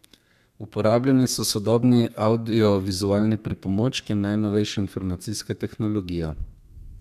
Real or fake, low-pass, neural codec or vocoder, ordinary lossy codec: fake; 14.4 kHz; codec, 32 kHz, 1.9 kbps, SNAC; none